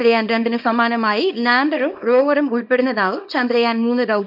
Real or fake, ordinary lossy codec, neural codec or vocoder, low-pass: fake; none; codec, 24 kHz, 0.9 kbps, WavTokenizer, small release; 5.4 kHz